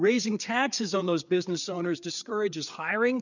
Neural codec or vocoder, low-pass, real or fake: codec, 16 kHz, 4 kbps, FreqCodec, larger model; 7.2 kHz; fake